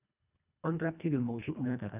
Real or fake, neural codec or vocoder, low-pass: fake; codec, 24 kHz, 1.5 kbps, HILCodec; 3.6 kHz